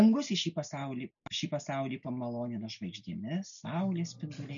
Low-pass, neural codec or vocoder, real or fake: 7.2 kHz; none; real